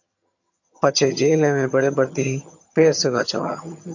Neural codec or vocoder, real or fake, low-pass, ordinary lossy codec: vocoder, 22.05 kHz, 80 mel bands, HiFi-GAN; fake; 7.2 kHz; AAC, 48 kbps